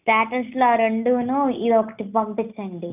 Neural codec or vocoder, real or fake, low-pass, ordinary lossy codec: none; real; 3.6 kHz; none